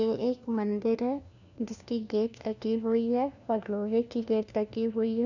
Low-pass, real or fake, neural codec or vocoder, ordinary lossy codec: 7.2 kHz; fake; codec, 16 kHz, 1 kbps, FunCodec, trained on LibriTTS, 50 frames a second; none